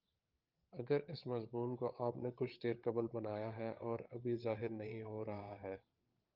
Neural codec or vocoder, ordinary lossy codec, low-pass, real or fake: codec, 16 kHz, 8 kbps, FreqCodec, larger model; Opus, 24 kbps; 5.4 kHz; fake